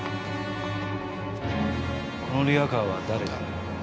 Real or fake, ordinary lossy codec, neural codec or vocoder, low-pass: real; none; none; none